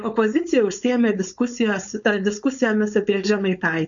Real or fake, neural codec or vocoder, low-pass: fake; codec, 16 kHz, 4.8 kbps, FACodec; 7.2 kHz